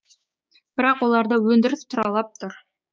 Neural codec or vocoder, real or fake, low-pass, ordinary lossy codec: codec, 16 kHz, 6 kbps, DAC; fake; none; none